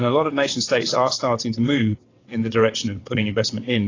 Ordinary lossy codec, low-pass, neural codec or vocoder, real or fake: AAC, 32 kbps; 7.2 kHz; vocoder, 22.05 kHz, 80 mel bands, WaveNeXt; fake